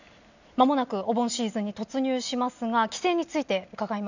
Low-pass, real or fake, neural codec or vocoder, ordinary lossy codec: 7.2 kHz; real; none; none